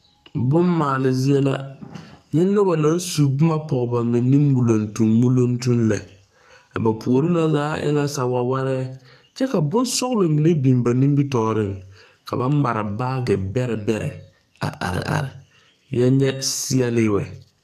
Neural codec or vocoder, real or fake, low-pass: codec, 44.1 kHz, 2.6 kbps, SNAC; fake; 14.4 kHz